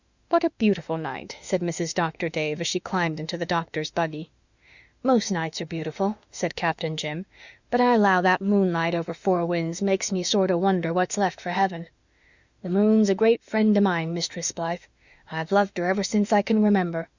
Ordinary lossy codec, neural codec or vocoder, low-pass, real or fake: Opus, 64 kbps; autoencoder, 48 kHz, 32 numbers a frame, DAC-VAE, trained on Japanese speech; 7.2 kHz; fake